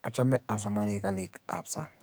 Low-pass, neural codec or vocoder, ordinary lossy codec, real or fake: none; codec, 44.1 kHz, 2.6 kbps, SNAC; none; fake